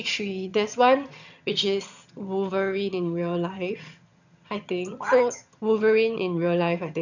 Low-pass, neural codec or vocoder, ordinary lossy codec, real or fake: 7.2 kHz; vocoder, 22.05 kHz, 80 mel bands, HiFi-GAN; none; fake